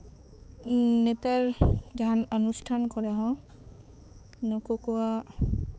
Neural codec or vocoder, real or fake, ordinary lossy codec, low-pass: codec, 16 kHz, 4 kbps, X-Codec, HuBERT features, trained on balanced general audio; fake; none; none